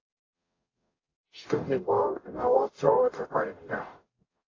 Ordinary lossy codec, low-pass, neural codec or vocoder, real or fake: AAC, 32 kbps; 7.2 kHz; codec, 44.1 kHz, 0.9 kbps, DAC; fake